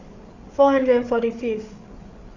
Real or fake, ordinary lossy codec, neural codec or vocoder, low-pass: fake; none; codec, 16 kHz, 8 kbps, FreqCodec, larger model; 7.2 kHz